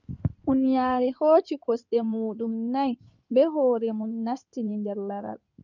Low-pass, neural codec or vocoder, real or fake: 7.2 kHz; codec, 16 kHz in and 24 kHz out, 2.2 kbps, FireRedTTS-2 codec; fake